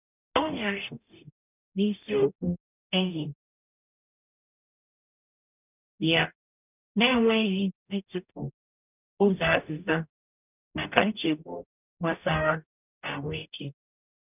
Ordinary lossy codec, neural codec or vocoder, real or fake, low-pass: none; codec, 44.1 kHz, 0.9 kbps, DAC; fake; 3.6 kHz